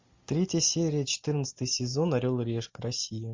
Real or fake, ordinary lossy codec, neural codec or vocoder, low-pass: real; MP3, 48 kbps; none; 7.2 kHz